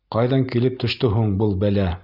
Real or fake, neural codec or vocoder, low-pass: real; none; 5.4 kHz